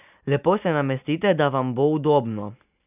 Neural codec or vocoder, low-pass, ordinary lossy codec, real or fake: none; 3.6 kHz; none; real